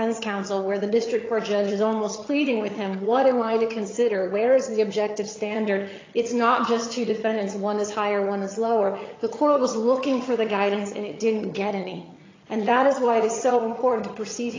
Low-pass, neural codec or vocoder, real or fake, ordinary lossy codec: 7.2 kHz; vocoder, 22.05 kHz, 80 mel bands, HiFi-GAN; fake; AAC, 32 kbps